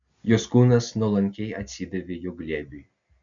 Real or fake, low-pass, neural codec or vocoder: real; 7.2 kHz; none